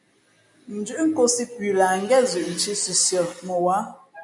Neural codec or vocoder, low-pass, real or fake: none; 10.8 kHz; real